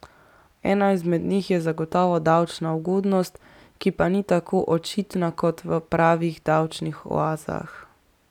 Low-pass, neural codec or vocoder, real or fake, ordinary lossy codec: 19.8 kHz; none; real; none